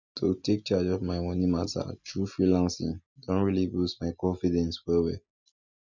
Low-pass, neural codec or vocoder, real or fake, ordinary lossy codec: 7.2 kHz; none; real; none